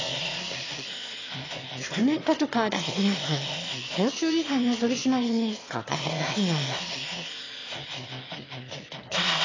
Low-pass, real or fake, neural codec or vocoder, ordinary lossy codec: 7.2 kHz; fake; autoencoder, 22.05 kHz, a latent of 192 numbers a frame, VITS, trained on one speaker; AAC, 32 kbps